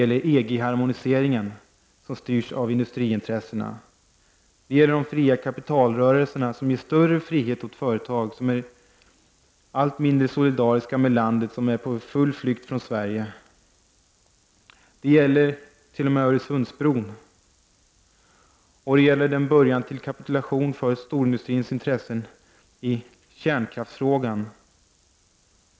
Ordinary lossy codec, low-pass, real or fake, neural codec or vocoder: none; none; real; none